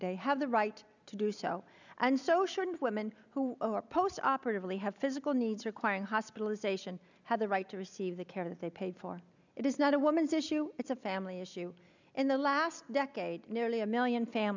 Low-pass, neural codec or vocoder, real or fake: 7.2 kHz; none; real